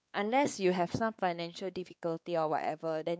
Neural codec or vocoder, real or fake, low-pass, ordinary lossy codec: codec, 16 kHz, 4 kbps, X-Codec, WavLM features, trained on Multilingual LibriSpeech; fake; none; none